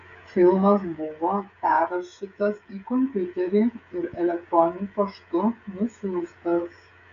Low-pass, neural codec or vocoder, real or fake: 7.2 kHz; codec, 16 kHz, 8 kbps, FreqCodec, smaller model; fake